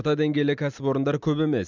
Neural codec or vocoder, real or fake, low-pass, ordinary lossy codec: none; real; 7.2 kHz; none